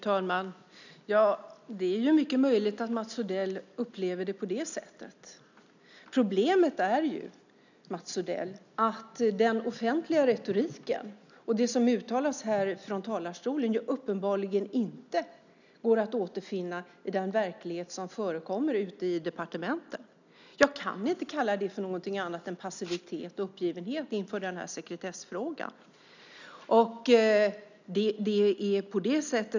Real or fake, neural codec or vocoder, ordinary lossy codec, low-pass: real; none; none; 7.2 kHz